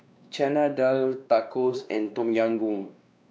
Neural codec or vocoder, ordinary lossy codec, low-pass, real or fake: codec, 16 kHz, 2 kbps, X-Codec, WavLM features, trained on Multilingual LibriSpeech; none; none; fake